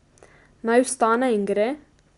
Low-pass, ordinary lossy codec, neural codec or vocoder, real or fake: 10.8 kHz; none; none; real